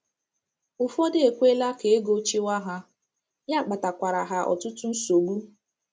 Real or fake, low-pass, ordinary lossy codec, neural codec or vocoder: real; none; none; none